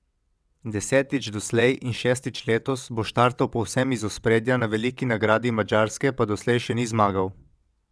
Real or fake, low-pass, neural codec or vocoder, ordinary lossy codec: fake; none; vocoder, 22.05 kHz, 80 mel bands, WaveNeXt; none